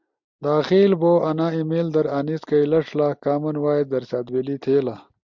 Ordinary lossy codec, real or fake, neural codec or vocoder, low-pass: MP3, 64 kbps; real; none; 7.2 kHz